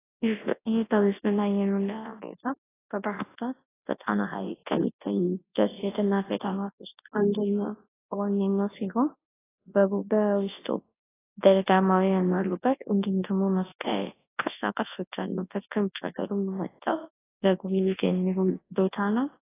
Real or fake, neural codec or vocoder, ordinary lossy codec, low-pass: fake; codec, 24 kHz, 0.9 kbps, WavTokenizer, large speech release; AAC, 16 kbps; 3.6 kHz